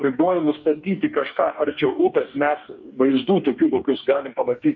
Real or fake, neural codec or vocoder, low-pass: fake; codec, 44.1 kHz, 2.6 kbps, DAC; 7.2 kHz